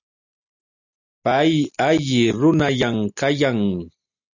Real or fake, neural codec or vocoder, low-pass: real; none; 7.2 kHz